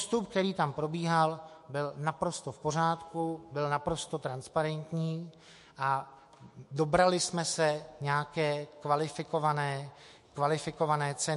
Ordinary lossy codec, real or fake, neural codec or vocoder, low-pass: MP3, 48 kbps; fake; autoencoder, 48 kHz, 128 numbers a frame, DAC-VAE, trained on Japanese speech; 14.4 kHz